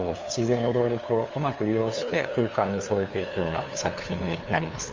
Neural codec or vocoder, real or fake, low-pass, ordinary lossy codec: codec, 16 kHz, 2 kbps, FunCodec, trained on LibriTTS, 25 frames a second; fake; 7.2 kHz; Opus, 32 kbps